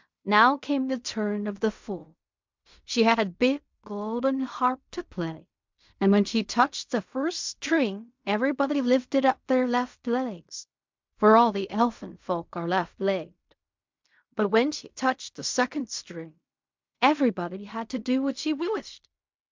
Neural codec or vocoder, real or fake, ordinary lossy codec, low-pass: codec, 16 kHz in and 24 kHz out, 0.4 kbps, LongCat-Audio-Codec, fine tuned four codebook decoder; fake; MP3, 64 kbps; 7.2 kHz